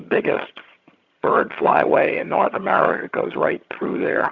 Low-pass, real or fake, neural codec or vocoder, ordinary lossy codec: 7.2 kHz; fake; vocoder, 22.05 kHz, 80 mel bands, HiFi-GAN; Opus, 64 kbps